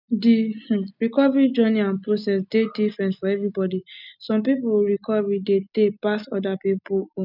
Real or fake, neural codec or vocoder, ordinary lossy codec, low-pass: real; none; none; 5.4 kHz